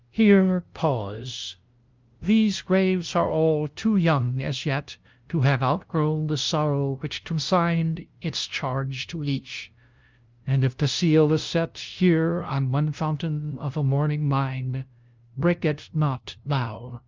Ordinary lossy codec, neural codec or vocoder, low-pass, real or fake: Opus, 24 kbps; codec, 16 kHz, 0.5 kbps, FunCodec, trained on LibriTTS, 25 frames a second; 7.2 kHz; fake